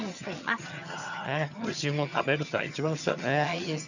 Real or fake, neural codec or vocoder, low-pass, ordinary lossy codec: fake; vocoder, 22.05 kHz, 80 mel bands, HiFi-GAN; 7.2 kHz; none